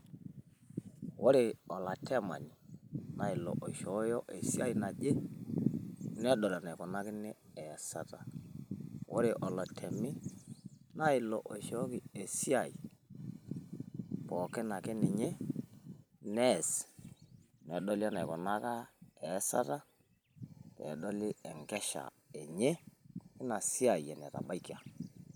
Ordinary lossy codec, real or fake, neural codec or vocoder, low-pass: none; real; none; none